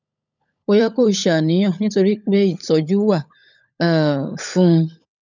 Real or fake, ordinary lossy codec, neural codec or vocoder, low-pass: fake; none; codec, 16 kHz, 16 kbps, FunCodec, trained on LibriTTS, 50 frames a second; 7.2 kHz